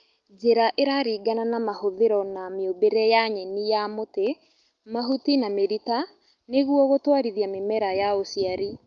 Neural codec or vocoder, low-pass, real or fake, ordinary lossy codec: none; 7.2 kHz; real; Opus, 32 kbps